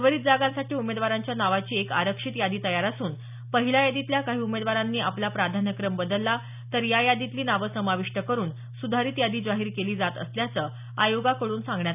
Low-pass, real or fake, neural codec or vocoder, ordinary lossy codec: 3.6 kHz; real; none; none